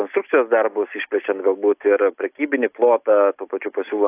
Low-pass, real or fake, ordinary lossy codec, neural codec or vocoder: 3.6 kHz; real; AAC, 32 kbps; none